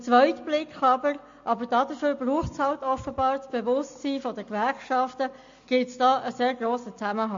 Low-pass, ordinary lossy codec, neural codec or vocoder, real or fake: 7.2 kHz; MP3, 48 kbps; none; real